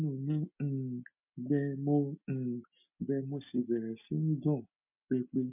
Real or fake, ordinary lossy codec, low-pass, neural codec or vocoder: real; MP3, 32 kbps; 3.6 kHz; none